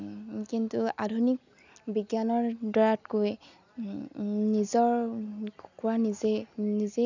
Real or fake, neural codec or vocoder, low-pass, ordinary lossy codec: real; none; 7.2 kHz; none